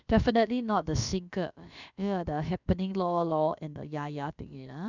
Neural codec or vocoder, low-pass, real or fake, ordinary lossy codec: codec, 16 kHz, about 1 kbps, DyCAST, with the encoder's durations; 7.2 kHz; fake; none